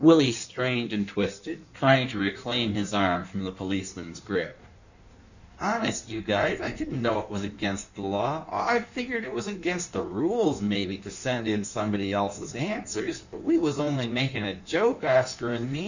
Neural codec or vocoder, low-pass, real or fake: codec, 16 kHz in and 24 kHz out, 1.1 kbps, FireRedTTS-2 codec; 7.2 kHz; fake